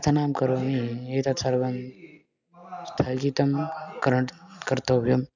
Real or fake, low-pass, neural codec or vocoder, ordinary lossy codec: real; 7.2 kHz; none; none